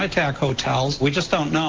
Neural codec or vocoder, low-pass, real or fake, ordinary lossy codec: none; 7.2 kHz; real; Opus, 16 kbps